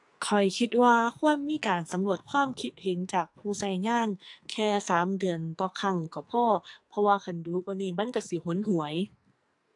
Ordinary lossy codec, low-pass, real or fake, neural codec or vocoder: AAC, 64 kbps; 10.8 kHz; fake; codec, 44.1 kHz, 2.6 kbps, SNAC